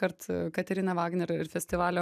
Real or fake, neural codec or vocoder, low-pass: real; none; 14.4 kHz